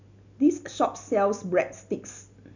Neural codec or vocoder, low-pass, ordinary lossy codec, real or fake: none; 7.2 kHz; none; real